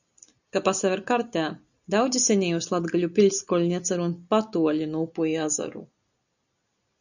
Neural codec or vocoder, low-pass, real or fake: none; 7.2 kHz; real